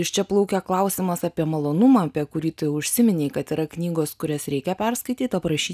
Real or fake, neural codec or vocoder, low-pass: real; none; 14.4 kHz